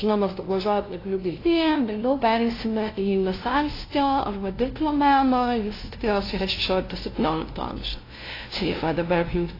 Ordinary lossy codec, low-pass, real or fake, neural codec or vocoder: AAC, 24 kbps; 5.4 kHz; fake; codec, 16 kHz, 0.5 kbps, FunCodec, trained on LibriTTS, 25 frames a second